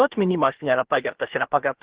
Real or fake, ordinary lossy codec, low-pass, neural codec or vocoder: fake; Opus, 16 kbps; 3.6 kHz; codec, 16 kHz, about 1 kbps, DyCAST, with the encoder's durations